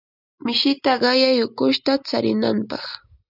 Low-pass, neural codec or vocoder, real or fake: 5.4 kHz; none; real